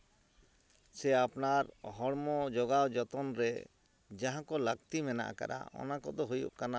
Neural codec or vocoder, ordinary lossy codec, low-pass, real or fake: none; none; none; real